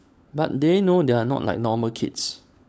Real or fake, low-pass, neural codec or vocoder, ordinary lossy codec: fake; none; codec, 16 kHz, 16 kbps, FunCodec, trained on LibriTTS, 50 frames a second; none